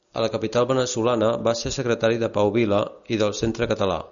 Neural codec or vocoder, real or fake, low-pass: none; real; 7.2 kHz